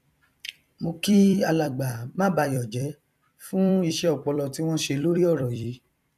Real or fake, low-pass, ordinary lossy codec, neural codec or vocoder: fake; 14.4 kHz; none; vocoder, 44.1 kHz, 128 mel bands every 256 samples, BigVGAN v2